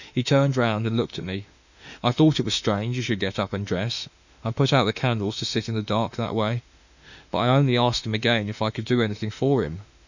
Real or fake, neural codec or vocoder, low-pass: fake; autoencoder, 48 kHz, 32 numbers a frame, DAC-VAE, trained on Japanese speech; 7.2 kHz